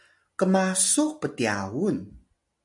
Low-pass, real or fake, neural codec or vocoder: 10.8 kHz; real; none